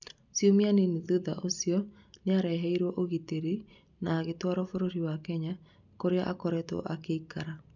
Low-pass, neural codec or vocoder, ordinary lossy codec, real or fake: 7.2 kHz; none; none; real